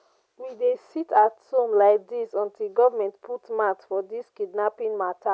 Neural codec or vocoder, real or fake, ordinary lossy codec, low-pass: none; real; none; none